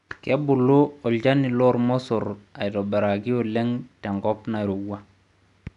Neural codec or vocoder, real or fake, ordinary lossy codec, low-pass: none; real; none; 10.8 kHz